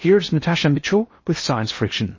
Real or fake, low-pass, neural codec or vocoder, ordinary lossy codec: fake; 7.2 kHz; codec, 16 kHz in and 24 kHz out, 0.8 kbps, FocalCodec, streaming, 65536 codes; MP3, 32 kbps